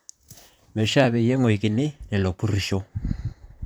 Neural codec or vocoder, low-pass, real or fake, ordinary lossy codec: vocoder, 44.1 kHz, 128 mel bands, Pupu-Vocoder; none; fake; none